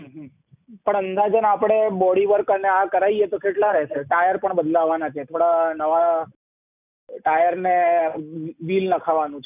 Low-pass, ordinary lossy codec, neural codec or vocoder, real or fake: 3.6 kHz; none; none; real